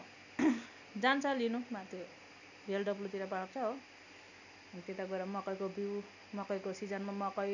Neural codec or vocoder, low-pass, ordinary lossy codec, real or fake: none; 7.2 kHz; none; real